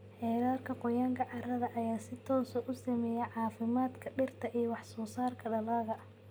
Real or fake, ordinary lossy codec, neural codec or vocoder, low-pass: real; none; none; none